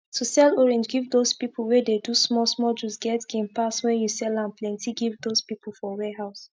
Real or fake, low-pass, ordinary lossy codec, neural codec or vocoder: real; none; none; none